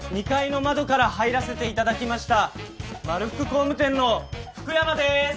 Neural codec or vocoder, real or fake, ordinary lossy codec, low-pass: none; real; none; none